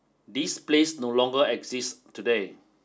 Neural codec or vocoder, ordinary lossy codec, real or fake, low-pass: none; none; real; none